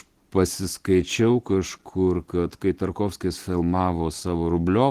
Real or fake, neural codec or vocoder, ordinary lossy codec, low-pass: real; none; Opus, 16 kbps; 14.4 kHz